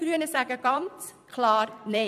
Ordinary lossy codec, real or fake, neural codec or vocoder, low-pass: none; fake; vocoder, 44.1 kHz, 128 mel bands every 256 samples, BigVGAN v2; 14.4 kHz